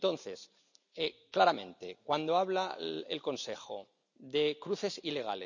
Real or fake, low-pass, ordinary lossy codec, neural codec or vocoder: real; 7.2 kHz; none; none